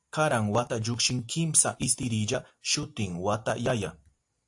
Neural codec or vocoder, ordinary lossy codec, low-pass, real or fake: none; AAC, 64 kbps; 10.8 kHz; real